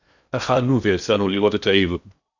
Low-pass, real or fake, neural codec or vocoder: 7.2 kHz; fake; codec, 16 kHz in and 24 kHz out, 0.8 kbps, FocalCodec, streaming, 65536 codes